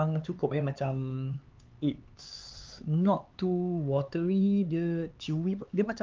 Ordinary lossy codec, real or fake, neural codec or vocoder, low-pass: Opus, 32 kbps; fake; codec, 16 kHz, 4 kbps, X-Codec, HuBERT features, trained on LibriSpeech; 7.2 kHz